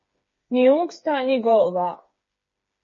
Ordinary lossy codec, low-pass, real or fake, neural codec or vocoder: MP3, 32 kbps; 7.2 kHz; fake; codec, 16 kHz, 4 kbps, FreqCodec, smaller model